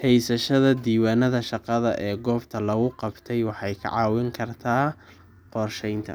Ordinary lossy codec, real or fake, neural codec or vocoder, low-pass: none; real; none; none